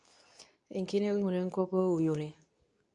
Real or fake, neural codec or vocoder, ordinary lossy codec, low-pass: fake; codec, 24 kHz, 0.9 kbps, WavTokenizer, medium speech release version 2; none; 10.8 kHz